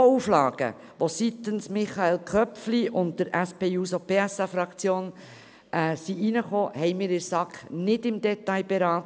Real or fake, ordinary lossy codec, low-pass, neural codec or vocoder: real; none; none; none